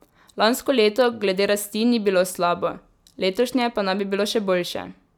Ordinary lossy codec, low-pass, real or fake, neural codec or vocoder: none; 19.8 kHz; real; none